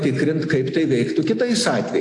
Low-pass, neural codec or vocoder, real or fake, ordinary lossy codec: 10.8 kHz; none; real; AAC, 48 kbps